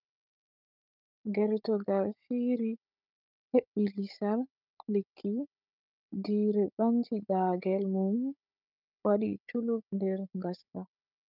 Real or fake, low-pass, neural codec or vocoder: fake; 5.4 kHz; codec, 16 kHz, 16 kbps, FunCodec, trained on Chinese and English, 50 frames a second